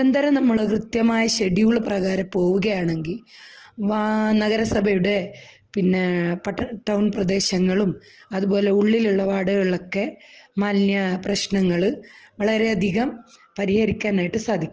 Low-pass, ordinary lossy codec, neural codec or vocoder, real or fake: 7.2 kHz; Opus, 16 kbps; none; real